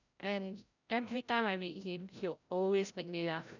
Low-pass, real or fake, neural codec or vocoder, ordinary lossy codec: 7.2 kHz; fake; codec, 16 kHz, 0.5 kbps, FreqCodec, larger model; none